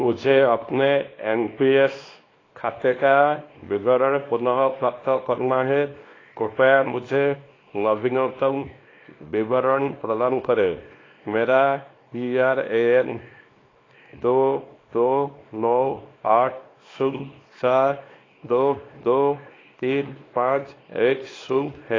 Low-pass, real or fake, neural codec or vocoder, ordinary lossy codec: 7.2 kHz; fake; codec, 24 kHz, 0.9 kbps, WavTokenizer, small release; AAC, 32 kbps